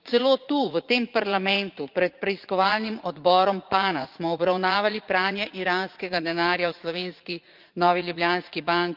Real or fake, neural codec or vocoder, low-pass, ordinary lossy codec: fake; vocoder, 44.1 kHz, 80 mel bands, Vocos; 5.4 kHz; Opus, 24 kbps